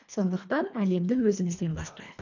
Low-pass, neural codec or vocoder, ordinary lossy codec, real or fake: 7.2 kHz; codec, 24 kHz, 1.5 kbps, HILCodec; none; fake